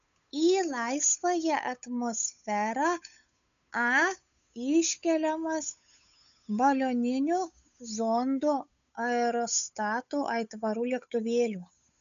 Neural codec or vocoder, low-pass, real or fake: codec, 16 kHz, 8 kbps, FunCodec, trained on Chinese and English, 25 frames a second; 7.2 kHz; fake